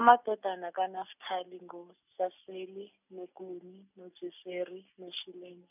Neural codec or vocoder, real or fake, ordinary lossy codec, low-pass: none; real; none; 3.6 kHz